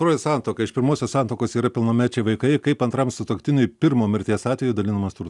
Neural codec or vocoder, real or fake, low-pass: none; real; 10.8 kHz